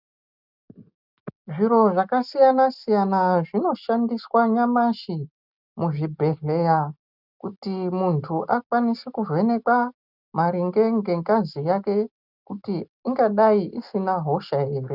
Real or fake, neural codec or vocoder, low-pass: real; none; 5.4 kHz